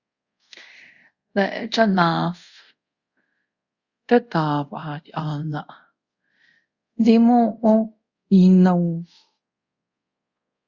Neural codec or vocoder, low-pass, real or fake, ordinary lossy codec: codec, 24 kHz, 0.5 kbps, DualCodec; 7.2 kHz; fake; Opus, 64 kbps